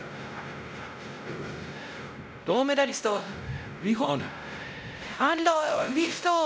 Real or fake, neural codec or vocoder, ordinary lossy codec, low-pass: fake; codec, 16 kHz, 0.5 kbps, X-Codec, WavLM features, trained on Multilingual LibriSpeech; none; none